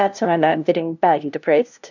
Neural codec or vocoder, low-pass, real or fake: codec, 16 kHz, 0.5 kbps, FunCodec, trained on LibriTTS, 25 frames a second; 7.2 kHz; fake